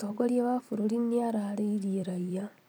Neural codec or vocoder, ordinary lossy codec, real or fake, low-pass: vocoder, 44.1 kHz, 128 mel bands every 256 samples, BigVGAN v2; none; fake; none